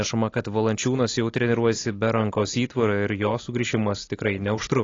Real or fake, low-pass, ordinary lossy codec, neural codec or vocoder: real; 7.2 kHz; AAC, 32 kbps; none